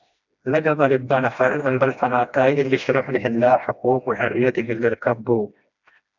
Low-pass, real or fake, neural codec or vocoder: 7.2 kHz; fake; codec, 16 kHz, 1 kbps, FreqCodec, smaller model